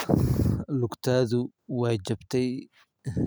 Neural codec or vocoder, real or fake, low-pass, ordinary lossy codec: none; real; none; none